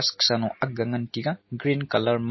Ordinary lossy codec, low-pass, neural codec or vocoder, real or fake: MP3, 24 kbps; 7.2 kHz; none; real